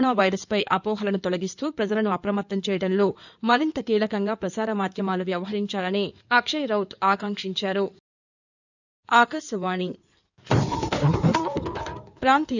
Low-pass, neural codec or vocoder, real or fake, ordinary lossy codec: 7.2 kHz; codec, 16 kHz in and 24 kHz out, 2.2 kbps, FireRedTTS-2 codec; fake; none